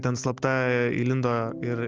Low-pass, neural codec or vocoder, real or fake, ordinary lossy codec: 7.2 kHz; none; real; Opus, 32 kbps